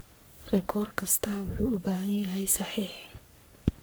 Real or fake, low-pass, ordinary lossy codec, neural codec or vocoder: fake; none; none; codec, 44.1 kHz, 3.4 kbps, Pupu-Codec